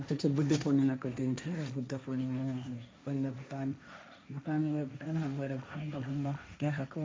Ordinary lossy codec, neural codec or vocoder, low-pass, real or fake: none; codec, 16 kHz, 1.1 kbps, Voila-Tokenizer; none; fake